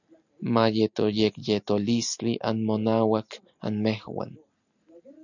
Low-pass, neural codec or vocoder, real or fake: 7.2 kHz; none; real